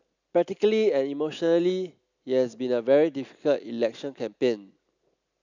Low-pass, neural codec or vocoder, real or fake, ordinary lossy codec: 7.2 kHz; none; real; none